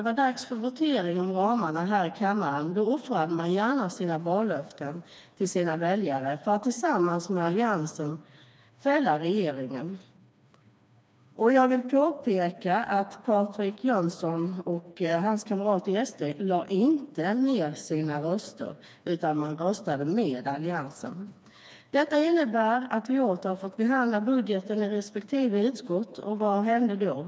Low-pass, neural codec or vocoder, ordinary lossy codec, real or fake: none; codec, 16 kHz, 2 kbps, FreqCodec, smaller model; none; fake